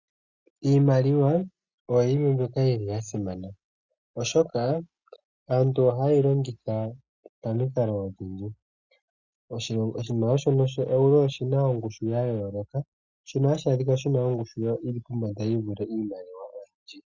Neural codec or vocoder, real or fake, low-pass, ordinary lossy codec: none; real; 7.2 kHz; Opus, 64 kbps